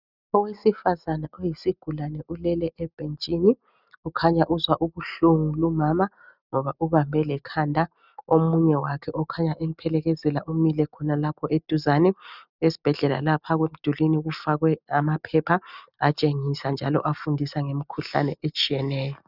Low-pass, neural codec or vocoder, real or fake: 5.4 kHz; none; real